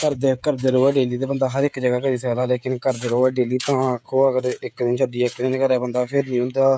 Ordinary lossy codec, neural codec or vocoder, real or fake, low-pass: none; codec, 16 kHz, 16 kbps, FreqCodec, smaller model; fake; none